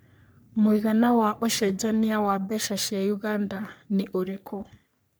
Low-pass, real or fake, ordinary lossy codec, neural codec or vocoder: none; fake; none; codec, 44.1 kHz, 3.4 kbps, Pupu-Codec